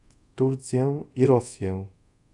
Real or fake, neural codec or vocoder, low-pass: fake; codec, 24 kHz, 0.5 kbps, DualCodec; 10.8 kHz